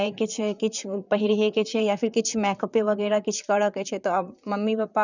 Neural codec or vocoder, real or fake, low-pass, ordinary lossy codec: vocoder, 44.1 kHz, 128 mel bands, Pupu-Vocoder; fake; 7.2 kHz; none